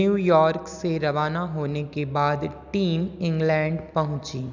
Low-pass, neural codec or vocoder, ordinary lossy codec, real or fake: 7.2 kHz; none; none; real